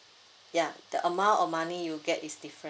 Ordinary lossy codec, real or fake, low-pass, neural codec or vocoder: none; real; none; none